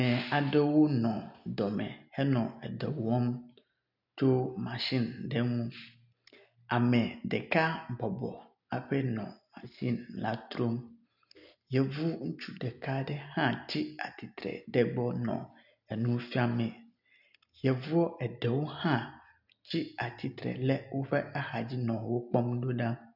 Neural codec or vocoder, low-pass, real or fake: none; 5.4 kHz; real